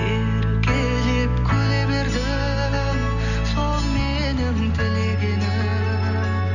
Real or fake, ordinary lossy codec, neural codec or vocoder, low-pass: real; none; none; 7.2 kHz